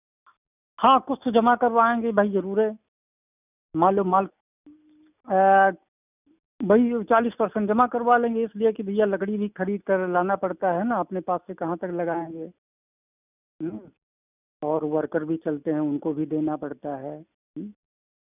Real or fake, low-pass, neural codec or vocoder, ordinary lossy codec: real; 3.6 kHz; none; none